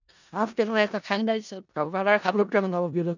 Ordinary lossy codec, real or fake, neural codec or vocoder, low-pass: none; fake; codec, 16 kHz in and 24 kHz out, 0.4 kbps, LongCat-Audio-Codec, four codebook decoder; 7.2 kHz